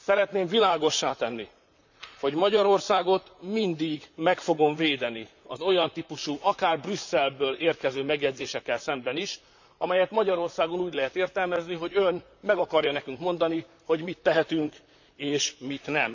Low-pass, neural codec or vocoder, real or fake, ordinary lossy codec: 7.2 kHz; vocoder, 44.1 kHz, 128 mel bands, Pupu-Vocoder; fake; none